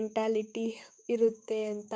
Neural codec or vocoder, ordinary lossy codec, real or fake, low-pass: codec, 16 kHz, 6 kbps, DAC; none; fake; none